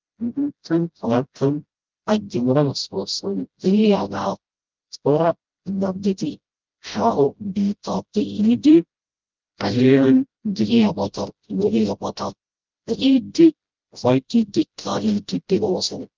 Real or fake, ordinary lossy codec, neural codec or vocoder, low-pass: fake; Opus, 24 kbps; codec, 16 kHz, 0.5 kbps, FreqCodec, smaller model; 7.2 kHz